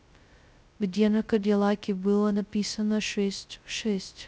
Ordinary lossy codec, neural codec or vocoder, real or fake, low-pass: none; codec, 16 kHz, 0.2 kbps, FocalCodec; fake; none